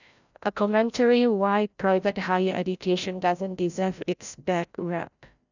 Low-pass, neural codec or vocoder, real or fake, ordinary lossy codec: 7.2 kHz; codec, 16 kHz, 0.5 kbps, FreqCodec, larger model; fake; none